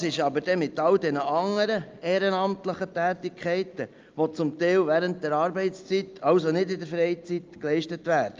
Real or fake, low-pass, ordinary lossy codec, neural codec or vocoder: real; 7.2 kHz; Opus, 24 kbps; none